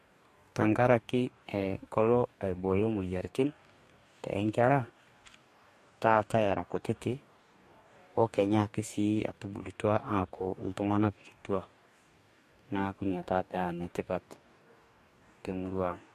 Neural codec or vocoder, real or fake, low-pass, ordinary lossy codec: codec, 44.1 kHz, 2.6 kbps, DAC; fake; 14.4 kHz; MP3, 64 kbps